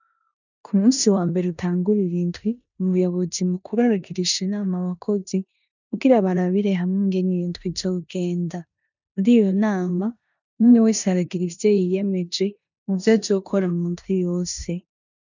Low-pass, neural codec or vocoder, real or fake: 7.2 kHz; codec, 16 kHz in and 24 kHz out, 0.9 kbps, LongCat-Audio-Codec, four codebook decoder; fake